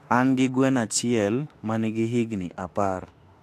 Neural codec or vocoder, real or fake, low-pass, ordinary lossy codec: autoencoder, 48 kHz, 32 numbers a frame, DAC-VAE, trained on Japanese speech; fake; 14.4 kHz; AAC, 64 kbps